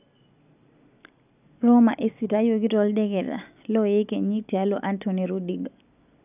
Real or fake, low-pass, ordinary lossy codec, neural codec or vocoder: real; 3.6 kHz; none; none